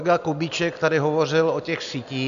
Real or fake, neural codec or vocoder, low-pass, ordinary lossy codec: real; none; 7.2 kHz; MP3, 96 kbps